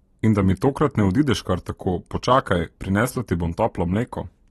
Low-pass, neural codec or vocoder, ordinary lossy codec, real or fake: 19.8 kHz; none; AAC, 32 kbps; real